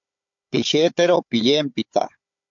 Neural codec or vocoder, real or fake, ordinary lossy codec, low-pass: codec, 16 kHz, 4 kbps, FunCodec, trained on Chinese and English, 50 frames a second; fake; MP3, 48 kbps; 7.2 kHz